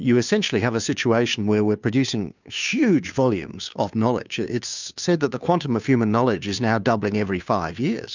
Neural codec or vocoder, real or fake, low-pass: codec, 16 kHz, 2 kbps, FunCodec, trained on Chinese and English, 25 frames a second; fake; 7.2 kHz